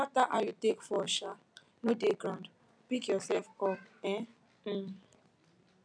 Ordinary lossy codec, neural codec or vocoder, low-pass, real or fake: none; none; 9.9 kHz; real